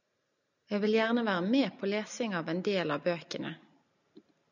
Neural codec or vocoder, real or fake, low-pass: none; real; 7.2 kHz